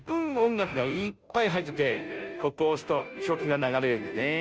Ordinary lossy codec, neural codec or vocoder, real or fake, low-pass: none; codec, 16 kHz, 0.5 kbps, FunCodec, trained on Chinese and English, 25 frames a second; fake; none